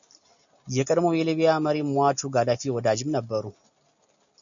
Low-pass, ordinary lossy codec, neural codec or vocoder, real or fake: 7.2 kHz; MP3, 96 kbps; none; real